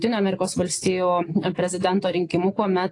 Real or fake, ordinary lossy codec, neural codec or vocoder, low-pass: real; AAC, 48 kbps; none; 10.8 kHz